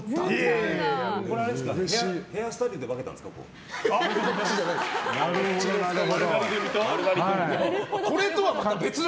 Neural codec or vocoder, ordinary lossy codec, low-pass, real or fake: none; none; none; real